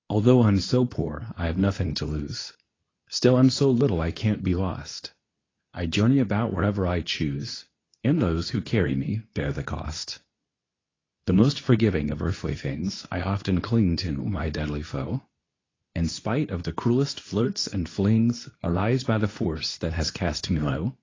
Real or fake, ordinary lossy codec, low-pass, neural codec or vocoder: fake; AAC, 32 kbps; 7.2 kHz; codec, 24 kHz, 0.9 kbps, WavTokenizer, medium speech release version 2